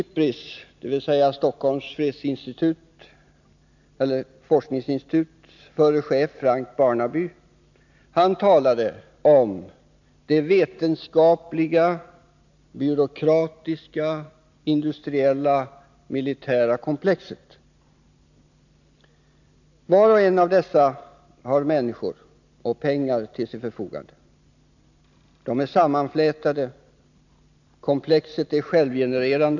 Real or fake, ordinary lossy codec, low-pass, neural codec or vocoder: real; none; 7.2 kHz; none